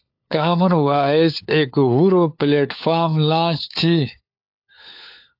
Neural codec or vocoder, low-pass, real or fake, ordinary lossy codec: codec, 16 kHz, 4 kbps, FunCodec, trained on LibriTTS, 50 frames a second; 5.4 kHz; fake; AAC, 48 kbps